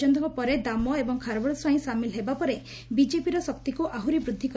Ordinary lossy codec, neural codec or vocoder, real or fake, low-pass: none; none; real; none